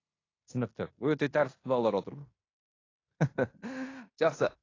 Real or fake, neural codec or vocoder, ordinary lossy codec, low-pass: fake; codec, 16 kHz in and 24 kHz out, 0.9 kbps, LongCat-Audio-Codec, fine tuned four codebook decoder; AAC, 32 kbps; 7.2 kHz